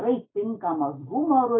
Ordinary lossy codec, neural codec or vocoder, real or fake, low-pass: AAC, 16 kbps; none; real; 7.2 kHz